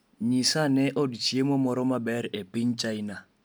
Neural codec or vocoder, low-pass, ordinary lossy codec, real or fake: none; none; none; real